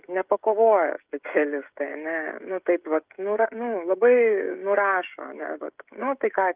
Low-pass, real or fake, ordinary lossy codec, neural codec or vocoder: 3.6 kHz; fake; Opus, 32 kbps; codec, 16 kHz, 16 kbps, FreqCodec, smaller model